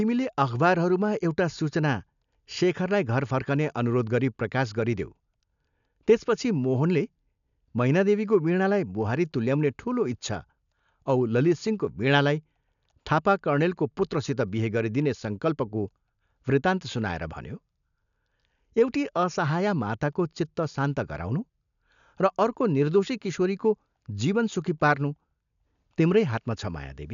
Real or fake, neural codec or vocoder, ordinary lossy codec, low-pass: real; none; none; 7.2 kHz